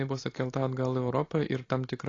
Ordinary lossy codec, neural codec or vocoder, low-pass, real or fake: AAC, 32 kbps; none; 7.2 kHz; real